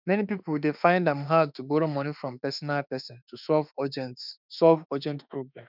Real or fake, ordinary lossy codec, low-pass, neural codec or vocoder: fake; none; 5.4 kHz; autoencoder, 48 kHz, 32 numbers a frame, DAC-VAE, trained on Japanese speech